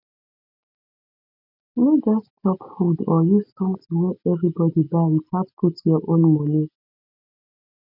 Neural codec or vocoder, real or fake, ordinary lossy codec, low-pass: none; real; none; 5.4 kHz